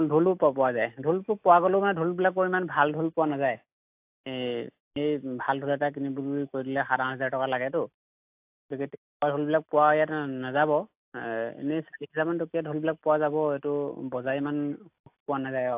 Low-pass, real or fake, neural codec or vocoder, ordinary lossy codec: 3.6 kHz; real; none; AAC, 32 kbps